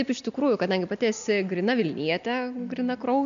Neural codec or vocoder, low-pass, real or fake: none; 7.2 kHz; real